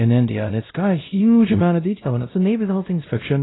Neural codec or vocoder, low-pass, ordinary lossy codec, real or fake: codec, 16 kHz, 0.5 kbps, X-Codec, WavLM features, trained on Multilingual LibriSpeech; 7.2 kHz; AAC, 16 kbps; fake